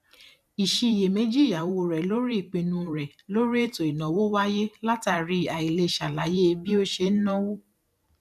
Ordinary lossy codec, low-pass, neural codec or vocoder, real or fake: none; 14.4 kHz; vocoder, 44.1 kHz, 128 mel bands every 512 samples, BigVGAN v2; fake